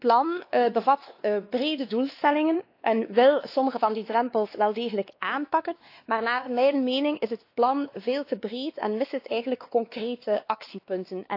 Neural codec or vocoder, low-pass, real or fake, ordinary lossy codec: codec, 16 kHz, 2 kbps, X-Codec, WavLM features, trained on Multilingual LibriSpeech; 5.4 kHz; fake; AAC, 32 kbps